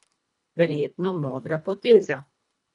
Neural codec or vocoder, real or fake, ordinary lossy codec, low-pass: codec, 24 kHz, 1.5 kbps, HILCodec; fake; none; 10.8 kHz